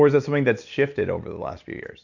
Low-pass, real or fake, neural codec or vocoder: 7.2 kHz; real; none